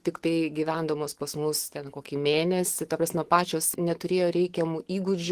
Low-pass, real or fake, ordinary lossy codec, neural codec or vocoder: 14.4 kHz; fake; Opus, 16 kbps; autoencoder, 48 kHz, 128 numbers a frame, DAC-VAE, trained on Japanese speech